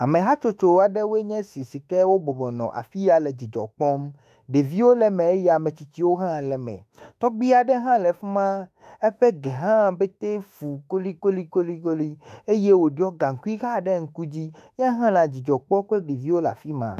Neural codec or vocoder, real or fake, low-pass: autoencoder, 48 kHz, 32 numbers a frame, DAC-VAE, trained on Japanese speech; fake; 14.4 kHz